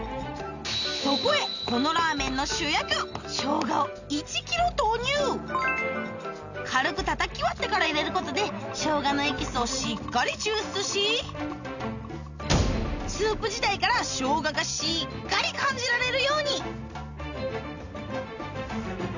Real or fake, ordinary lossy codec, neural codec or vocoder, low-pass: real; none; none; 7.2 kHz